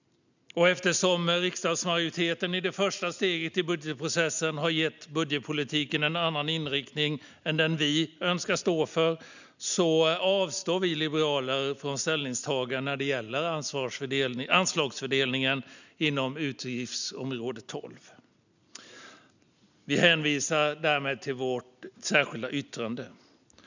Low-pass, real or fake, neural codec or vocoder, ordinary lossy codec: 7.2 kHz; real; none; none